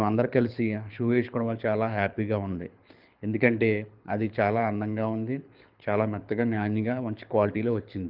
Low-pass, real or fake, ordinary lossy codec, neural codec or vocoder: 5.4 kHz; fake; Opus, 24 kbps; codec, 24 kHz, 6 kbps, HILCodec